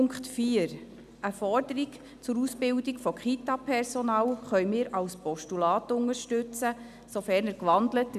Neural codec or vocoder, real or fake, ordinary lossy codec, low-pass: none; real; none; 14.4 kHz